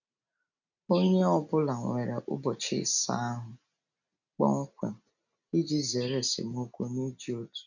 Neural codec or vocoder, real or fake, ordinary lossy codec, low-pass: none; real; none; 7.2 kHz